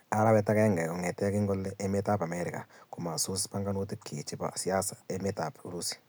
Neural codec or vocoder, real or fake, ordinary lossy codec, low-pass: none; real; none; none